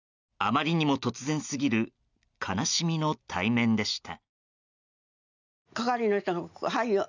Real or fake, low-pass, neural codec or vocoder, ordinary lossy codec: real; 7.2 kHz; none; none